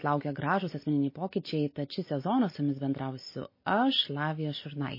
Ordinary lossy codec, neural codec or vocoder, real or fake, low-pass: MP3, 24 kbps; none; real; 5.4 kHz